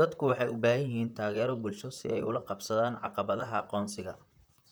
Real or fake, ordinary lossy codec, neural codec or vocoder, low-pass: fake; none; vocoder, 44.1 kHz, 128 mel bands, Pupu-Vocoder; none